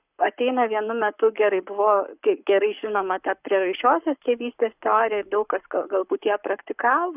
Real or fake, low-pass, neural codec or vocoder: fake; 3.6 kHz; codec, 44.1 kHz, 7.8 kbps, Pupu-Codec